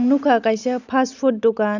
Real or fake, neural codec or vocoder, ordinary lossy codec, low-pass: real; none; none; 7.2 kHz